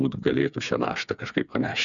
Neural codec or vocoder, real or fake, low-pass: codec, 16 kHz, 4 kbps, FreqCodec, smaller model; fake; 7.2 kHz